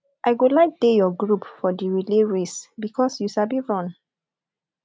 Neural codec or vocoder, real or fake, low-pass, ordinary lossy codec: none; real; none; none